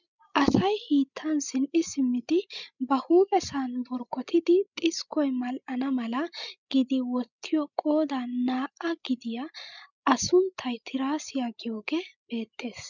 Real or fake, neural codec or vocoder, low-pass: fake; codec, 16 kHz, 16 kbps, FreqCodec, larger model; 7.2 kHz